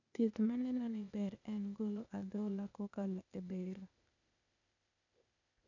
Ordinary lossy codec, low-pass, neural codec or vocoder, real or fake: none; 7.2 kHz; codec, 16 kHz, 0.8 kbps, ZipCodec; fake